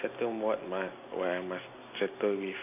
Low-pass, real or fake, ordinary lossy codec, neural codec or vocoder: 3.6 kHz; real; AAC, 24 kbps; none